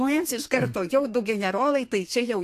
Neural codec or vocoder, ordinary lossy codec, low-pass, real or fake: codec, 32 kHz, 1.9 kbps, SNAC; AAC, 64 kbps; 14.4 kHz; fake